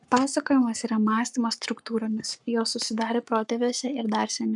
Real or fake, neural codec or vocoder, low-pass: fake; codec, 44.1 kHz, 7.8 kbps, Pupu-Codec; 10.8 kHz